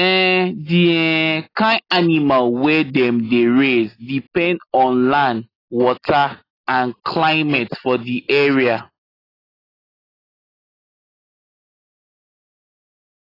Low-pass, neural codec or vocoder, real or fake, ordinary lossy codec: 5.4 kHz; none; real; AAC, 24 kbps